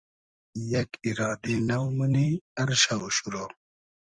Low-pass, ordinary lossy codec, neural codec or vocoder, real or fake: 9.9 kHz; Opus, 64 kbps; vocoder, 44.1 kHz, 128 mel bands every 256 samples, BigVGAN v2; fake